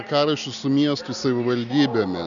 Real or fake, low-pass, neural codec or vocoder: real; 7.2 kHz; none